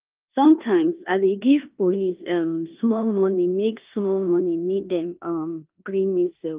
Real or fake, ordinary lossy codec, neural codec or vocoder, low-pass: fake; Opus, 32 kbps; codec, 16 kHz in and 24 kHz out, 0.9 kbps, LongCat-Audio-Codec, four codebook decoder; 3.6 kHz